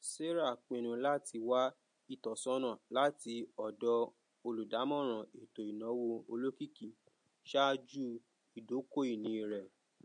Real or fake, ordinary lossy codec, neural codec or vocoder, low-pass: real; MP3, 48 kbps; none; 9.9 kHz